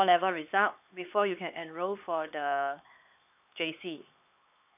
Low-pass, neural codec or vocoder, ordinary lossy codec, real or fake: 3.6 kHz; codec, 16 kHz, 4 kbps, X-Codec, HuBERT features, trained on LibriSpeech; none; fake